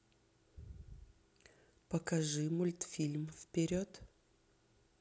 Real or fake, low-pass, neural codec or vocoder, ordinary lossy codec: real; none; none; none